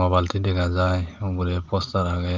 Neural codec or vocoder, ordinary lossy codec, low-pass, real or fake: none; Opus, 32 kbps; 7.2 kHz; real